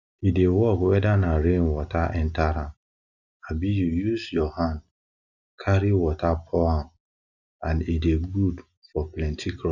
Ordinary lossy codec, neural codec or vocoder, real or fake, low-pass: none; none; real; 7.2 kHz